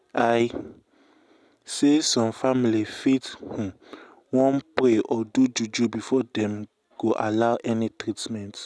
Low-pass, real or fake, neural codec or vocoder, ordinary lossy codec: none; real; none; none